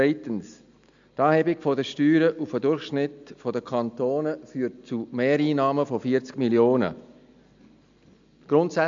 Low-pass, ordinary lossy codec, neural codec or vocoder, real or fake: 7.2 kHz; MP3, 64 kbps; none; real